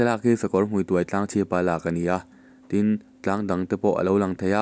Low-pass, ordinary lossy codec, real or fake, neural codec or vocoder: none; none; real; none